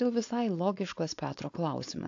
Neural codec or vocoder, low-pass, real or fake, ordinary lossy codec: codec, 16 kHz, 4.8 kbps, FACodec; 7.2 kHz; fake; AAC, 48 kbps